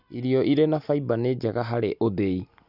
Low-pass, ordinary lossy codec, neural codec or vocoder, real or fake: 5.4 kHz; none; none; real